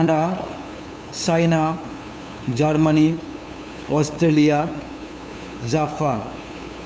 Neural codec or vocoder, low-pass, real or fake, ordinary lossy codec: codec, 16 kHz, 2 kbps, FunCodec, trained on LibriTTS, 25 frames a second; none; fake; none